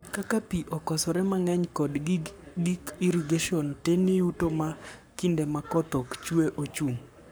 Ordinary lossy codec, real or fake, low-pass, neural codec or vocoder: none; fake; none; codec, 44.1 kHz, 7.8 kbps, Pupu-Codec